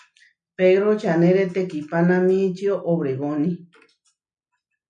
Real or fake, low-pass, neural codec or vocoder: real; 9.9 kHz; none